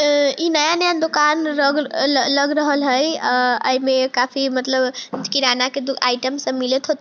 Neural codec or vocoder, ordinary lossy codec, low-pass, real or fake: none; none; none; real